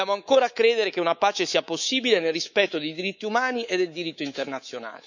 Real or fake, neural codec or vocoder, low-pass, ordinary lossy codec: fake; codec, 24 kHz, 3.1 kbps, DualCodec; 7.2 kHz; none